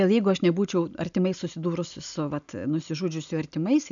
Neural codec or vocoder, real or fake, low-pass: none; real; 7.2 kHz